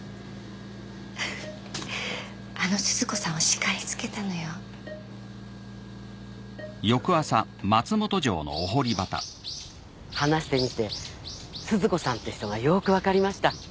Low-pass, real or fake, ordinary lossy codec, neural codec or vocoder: none; real; none; none